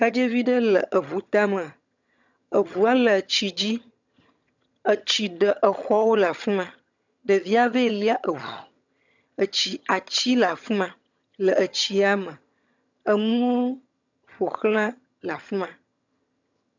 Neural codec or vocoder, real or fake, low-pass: vocoder, 22.05 kHz, 80 mel bands, HiFi-GAN; fake; 7.2 kHz